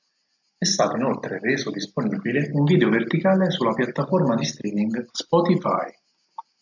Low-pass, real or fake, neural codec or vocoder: 7.2 kHz; real; none